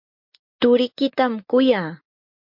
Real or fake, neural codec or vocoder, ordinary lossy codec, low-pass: real; none; MP3, 48 kbps; 5.4 kHz